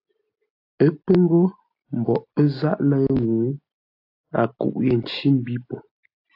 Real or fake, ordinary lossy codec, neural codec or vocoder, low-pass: real; AAC, 32 kbps; none; 5.4 kHz